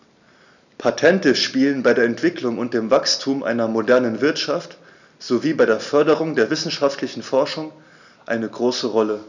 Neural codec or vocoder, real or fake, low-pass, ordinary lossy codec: none; real; 7.2 kHz; none